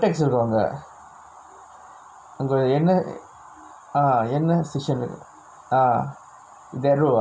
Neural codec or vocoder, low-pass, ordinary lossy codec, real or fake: none; none; none; real